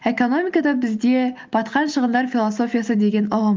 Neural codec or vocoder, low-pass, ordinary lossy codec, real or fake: none; 7.2 kHz; Opus, 32 kbps; real